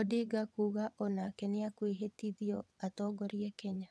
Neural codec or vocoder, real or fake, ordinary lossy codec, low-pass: vocoder, 22.05 kHz, 80 mel bands, WaveNeXt; fake; none; none